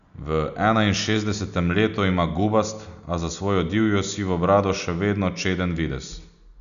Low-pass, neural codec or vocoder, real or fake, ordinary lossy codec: 7.2 kHz; none; real; none